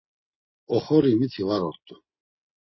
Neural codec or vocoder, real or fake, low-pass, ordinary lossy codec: none; real; 7.2 kHz; MP3, 24 kbps